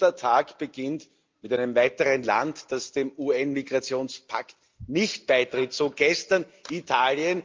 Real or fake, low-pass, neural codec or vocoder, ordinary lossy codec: real; 7.2 kHz; none; Opus, 24 kbps